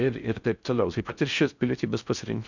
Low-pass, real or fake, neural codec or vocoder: 7.2 kHz; fake; codec, 16 kHz in and 24 kHz out, 0.6 kbps, FocalCodec, streaming, 4096 codes